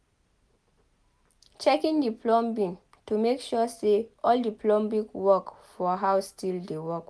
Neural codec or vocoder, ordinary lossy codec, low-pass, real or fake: none; none; none; real